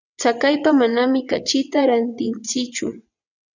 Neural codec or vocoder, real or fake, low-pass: vocoder, 22.05 kHz, 80 mel bands, WaveNeXt; fake; 7.2 kHz